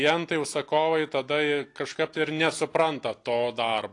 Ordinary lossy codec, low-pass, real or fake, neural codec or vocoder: AAC, 48 kbps; 10.8 kHz; real; none